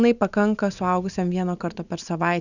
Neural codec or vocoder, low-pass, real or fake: none; 7.2 kHz; real